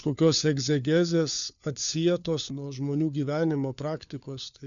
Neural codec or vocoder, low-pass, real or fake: codec, 16 kHz, 4 kbps, FunCodec, trained on LibriTTS, 50 frames a second; 7.2 kHz; fake